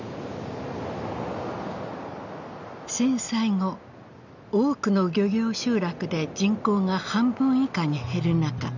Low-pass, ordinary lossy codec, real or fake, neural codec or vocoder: 7.2 kHz; none; real; none